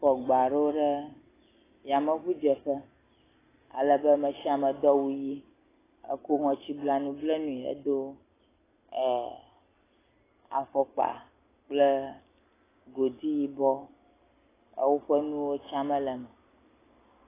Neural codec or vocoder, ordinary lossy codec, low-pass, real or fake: none; AAC, 16 kbps; 3.6 kHz; real